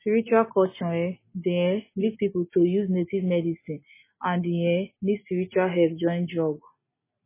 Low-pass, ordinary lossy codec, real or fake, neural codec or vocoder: 3.6 kHz; MP3, 16 kbps; real; none